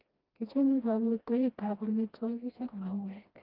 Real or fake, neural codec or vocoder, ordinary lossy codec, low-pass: fake; codec, 16 kHz, 1 kbps, FreqCodec, smaller model; Opus, 32 kbps; 5.4 kHz